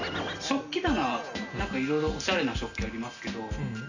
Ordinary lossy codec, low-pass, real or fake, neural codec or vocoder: none; 7.2 kHz; real; none